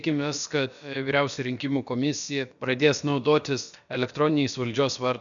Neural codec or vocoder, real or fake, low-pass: codec, 16 kHz, about 1 kbps, DyCAST, with the encoder's durations; fake; 7.2 kHz